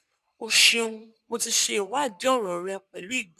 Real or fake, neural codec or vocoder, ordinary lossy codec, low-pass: fake; codec, 44.1 kHz, 3.4 kbps, Pupu-Codec; none; 14.4 kHz